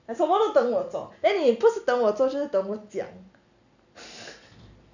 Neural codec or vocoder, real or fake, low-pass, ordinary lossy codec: none; real; 7.2 kHz; none